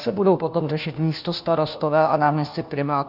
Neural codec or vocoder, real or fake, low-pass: codec, 16 kHz, 1 kbps, FunCodec, trained on LibriTTS, 50 frames a second; fake; 5.4 kHz